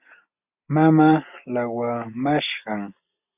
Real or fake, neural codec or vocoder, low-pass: real; none; 3.6 kHz